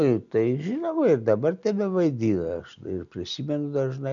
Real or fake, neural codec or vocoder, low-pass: real; none; 7.2 kHz